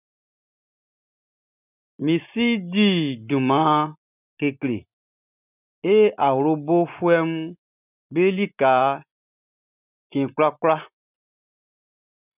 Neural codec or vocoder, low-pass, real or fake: none; 3.6 kHz; real